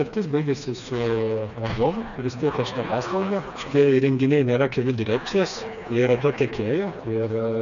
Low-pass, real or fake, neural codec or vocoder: 7.2 kHz; fake; codec, 16 kHz, 2 kbps, FreqCodec, smaller model